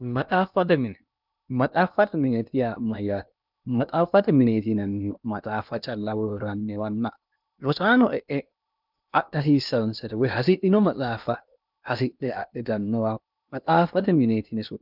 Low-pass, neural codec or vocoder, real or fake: 5.4 kHz; codec, 16 kHz in and 24 kHz out, 0.8 kbps, FocalCodec, streaming, 65536 codes; fake